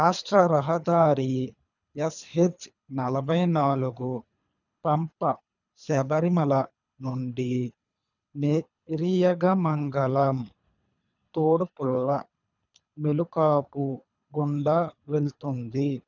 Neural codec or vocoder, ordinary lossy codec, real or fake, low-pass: codec, 24 kHz, 3 kbps, HILCodec; none; fake; 7.2 kHz